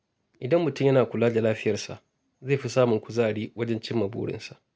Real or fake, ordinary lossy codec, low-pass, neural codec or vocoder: real; none; none; none